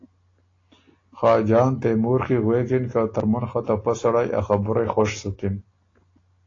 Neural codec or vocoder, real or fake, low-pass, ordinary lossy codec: none; real; 7.2 kHz; AAC, 32 kbps